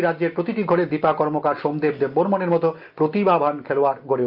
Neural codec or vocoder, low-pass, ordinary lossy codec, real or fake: none; 5.4 kHz; Opus, 32 kbps; real